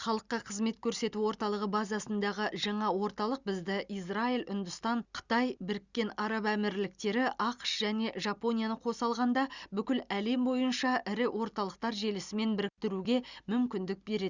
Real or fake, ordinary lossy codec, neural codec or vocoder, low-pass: real; Opus, 64 kbps; none; 7.2 kHz